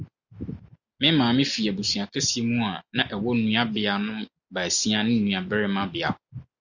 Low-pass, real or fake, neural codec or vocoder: 7.2 kHz; real; none